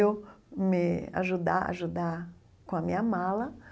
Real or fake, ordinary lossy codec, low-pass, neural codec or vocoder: real; none; none; none